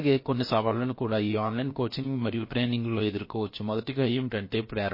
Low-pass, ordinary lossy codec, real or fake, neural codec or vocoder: 5.4 kHz; MP3, 24 kbps; fake; codec, 16 kHz, 0.7 kbps, FocalCodec